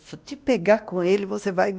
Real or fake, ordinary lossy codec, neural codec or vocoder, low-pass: fake; none; codec, 16 kHz, 1 kbps, X-Codec, WavLM features, trained on Multilingual LibriSpeech; none